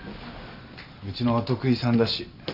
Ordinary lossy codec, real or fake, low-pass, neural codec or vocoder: none; real; 5.4 kHz; none